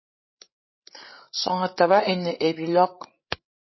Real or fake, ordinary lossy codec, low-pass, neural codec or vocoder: fake; MP3, 24 kbps; 7.2 kHz; codec, 16 kHz, 16 kbps, FreqCodec, larger model